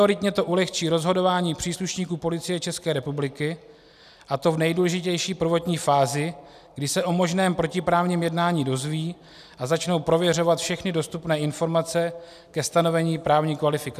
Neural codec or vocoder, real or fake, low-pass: none; real; 14.4 kHz